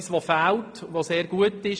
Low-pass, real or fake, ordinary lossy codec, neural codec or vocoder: 9.9 kHz; real; AAC, 64 kbps; none